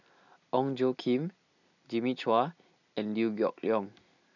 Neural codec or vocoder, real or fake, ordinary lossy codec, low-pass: none; real; none; 7.2 kHz